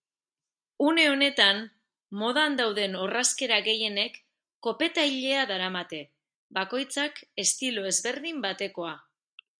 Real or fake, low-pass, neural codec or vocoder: real; 9.9 kHz; none